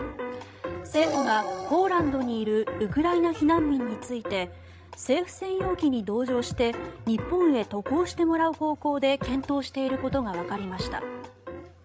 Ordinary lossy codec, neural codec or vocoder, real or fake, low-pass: none; codec, 16 kHz, 16 kbps, FreqCodec, larger model; fake; none